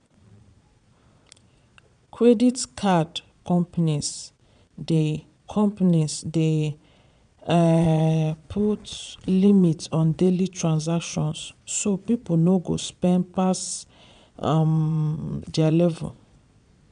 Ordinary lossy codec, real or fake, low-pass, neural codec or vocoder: none; fake; 9.9 kHz; vocoder, 22.05 kHz, 80 mel bands, Vocos